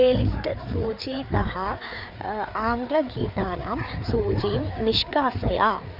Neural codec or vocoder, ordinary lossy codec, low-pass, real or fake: codec, 16 kHz, 4 kbps, FreqCodec, larger model; none; 5.4 kHz; fake